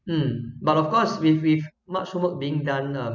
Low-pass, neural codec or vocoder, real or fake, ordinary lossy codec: 7.2 kHz; none; real; none